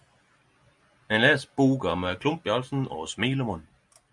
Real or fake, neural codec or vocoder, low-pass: real; none; 10.8 kHz